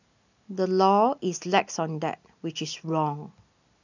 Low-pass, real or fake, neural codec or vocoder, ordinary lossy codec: 7.2 kHz; real; none; none